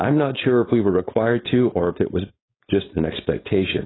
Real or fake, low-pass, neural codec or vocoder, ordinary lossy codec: fake; 7.2 kHz; codec, 16 kHz, 4.8 kbps, FACodec; AAC, 16 kbps